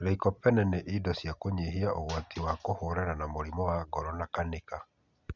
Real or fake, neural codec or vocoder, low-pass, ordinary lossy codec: real; none; 7.2 kHz; none